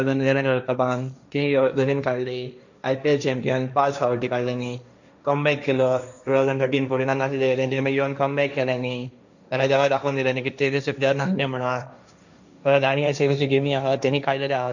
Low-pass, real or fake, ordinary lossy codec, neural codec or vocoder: 7.2 kHz; fake; none; codec, 16 kHz, 1.1 kbps, Voila-Tokenizer